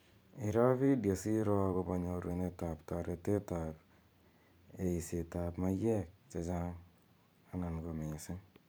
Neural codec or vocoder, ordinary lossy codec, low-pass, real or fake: vocoder, 44.1 kHz, 128 mel bands every 512 samples, BigVGAN v2; none; none; fake